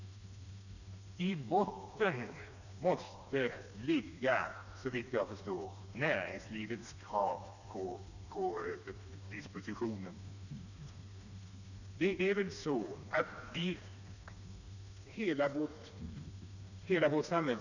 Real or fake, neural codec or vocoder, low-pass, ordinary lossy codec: fake; codec, 16 kHz, 2 kbps, FreqCodec, smaller model; 7.2 kHz; none